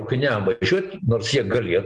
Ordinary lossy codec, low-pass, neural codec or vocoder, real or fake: Opus, 24 kbps; 9.9 kHz; none; real